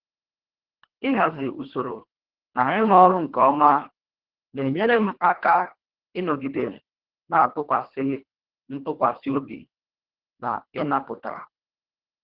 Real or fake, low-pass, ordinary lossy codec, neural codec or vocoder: fake; 5.4 kHz; Opus, 16 kbps; codec, 24 kHz, 1.5 kbps, HILCodec